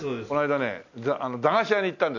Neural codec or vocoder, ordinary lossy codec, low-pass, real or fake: none; none; 7.2 kHz; real